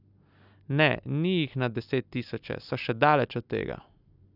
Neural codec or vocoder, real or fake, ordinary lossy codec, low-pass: none; real; none; 5.4 kHz